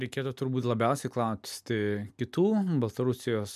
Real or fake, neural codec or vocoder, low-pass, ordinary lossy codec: real; none; 14.4 kHz; MP3, 96 kbps